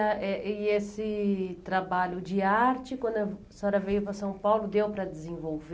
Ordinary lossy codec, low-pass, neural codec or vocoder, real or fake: none; none; none; real